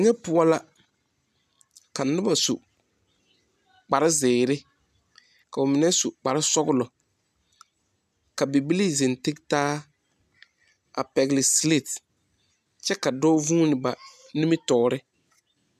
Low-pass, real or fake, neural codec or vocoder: 14.4 kHz; real; none